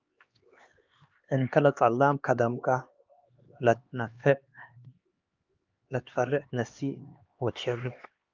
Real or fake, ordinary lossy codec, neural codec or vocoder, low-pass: fake; Opus, 24 kbps; codec, 16 kHz, 2 kbps, X-Codec, HuBERT features, trained on LibriSpeech; 7.2 kHz